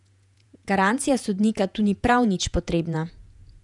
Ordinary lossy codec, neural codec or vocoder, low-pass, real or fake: none; vocoder, 48 kHz, 128 mel bands, Vocos; 10.8 kHz; fake